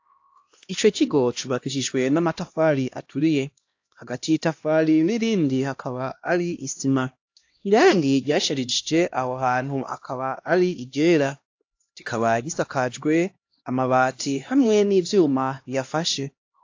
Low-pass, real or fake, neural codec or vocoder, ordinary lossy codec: 7.2 kHz; fake; codec, 16 kHz, 1 kbps, X-Codec, HuBERT features, trained on LibriSpeech; AAC, 48 kbps